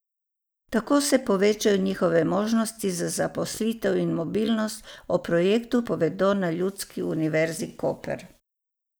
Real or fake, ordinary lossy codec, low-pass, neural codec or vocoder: real; none; none; none